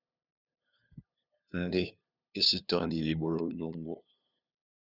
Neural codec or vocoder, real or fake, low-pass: codec, 16 kHz, 2 kbps, FunCodec, trained on LibriTTS, 25 frames a second; fake; 5.4 kHz